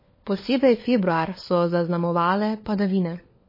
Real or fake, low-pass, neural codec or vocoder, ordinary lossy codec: fake; 5.4 kHz; codec, 16 kHz, 4 kbps, FunCodec, trained on LibriTTS, 50 frames a second; MP3, 24 kbps